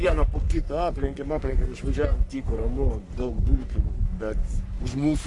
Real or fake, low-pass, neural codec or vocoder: fake; 10.8 kHz; codec, 44.1 kHz, 3.4 kbps, Pupu-Codec